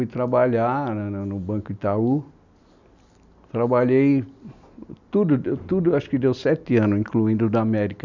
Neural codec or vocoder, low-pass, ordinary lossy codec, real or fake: none; 7.2 kHz; none; real